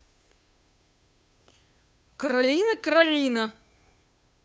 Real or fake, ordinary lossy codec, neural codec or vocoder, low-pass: fake; none; codec, 16 kHz, 2 kbps, FunCodec, trained on Chinese and English, 25 frames a second; none